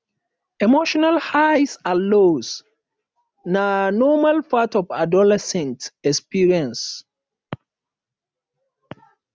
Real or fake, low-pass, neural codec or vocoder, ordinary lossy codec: real; none; none; none